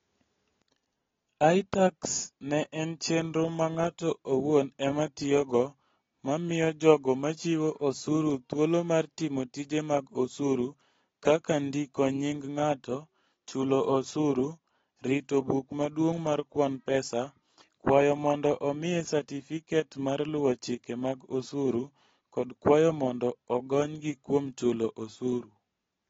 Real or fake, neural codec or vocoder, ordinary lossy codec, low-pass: real; none; AAC, 24 kbps; 7.2 kHz